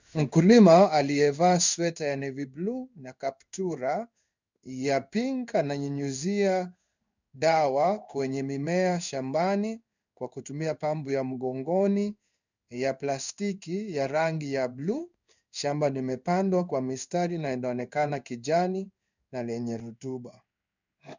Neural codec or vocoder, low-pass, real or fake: codec, 16 kHz in and 24 kHz out, 1 kbps, XY-Tokenizer; 7.2 kHz; fake